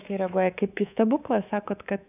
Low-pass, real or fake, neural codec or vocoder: 3.6 kHz; fake; codec, 24 kHz, 3.1 kbps, DualCodec